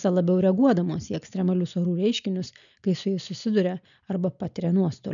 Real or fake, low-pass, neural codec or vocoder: real; 7.2 kHz; none